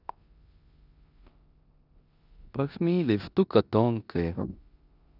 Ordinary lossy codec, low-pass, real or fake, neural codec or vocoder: none; 5.4 kHz; fake; codec, 16 kHz in and 24 kHz out, 0.9 kbps, LongCat-Audio-Codec, four codebook decoder